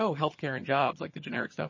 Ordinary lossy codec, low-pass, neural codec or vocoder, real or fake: MP3, 32 kbps; 7.2 kHz; vocoder, 22.05 kHz, 80 mel bands, HiFi-GAN; fake